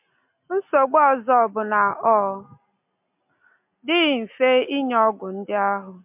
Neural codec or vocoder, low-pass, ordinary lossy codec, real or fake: none; 3.6 kHz; MP3, 32 kbps; real